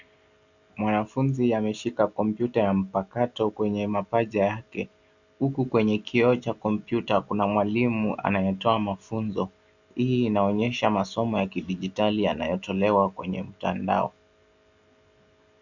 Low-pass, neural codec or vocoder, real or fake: 7.2 kHz; none; real